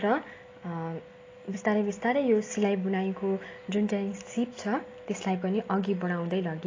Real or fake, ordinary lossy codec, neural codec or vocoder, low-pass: real; AAC, 32 kbps; none; 7.2 kHz